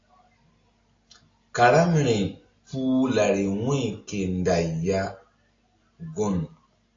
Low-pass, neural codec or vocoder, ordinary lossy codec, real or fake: 7.2 kHz; none; AAC, 32 kbps; real